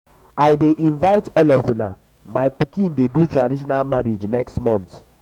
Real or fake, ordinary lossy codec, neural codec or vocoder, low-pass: fake; none; codec, 44.1 kHz, 2.6 kbps, DAC; 19.8 kHz